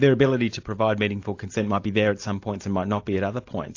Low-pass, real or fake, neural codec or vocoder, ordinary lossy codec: 7.2 kHz; real; none; AAC, 48 kbps